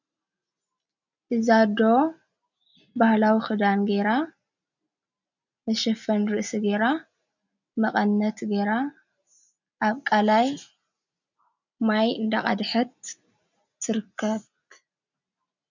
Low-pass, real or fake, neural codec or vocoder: 7.2 kHz; real; none